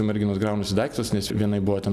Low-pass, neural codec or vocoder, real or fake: 14.4 kHz; none; real